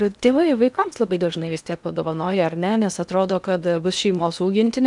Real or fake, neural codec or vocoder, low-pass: fake; codec, 16 kHz in and 24 kHz out, 0.8 kbps, FocalCodec, streaming, 65536 codes; 10.8 kHz